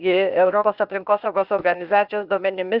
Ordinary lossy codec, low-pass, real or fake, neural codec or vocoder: Opus, 64 kbps; 5.4 kHz; fake; codec, 16 kHz, 0.8 kbps, ZipCodec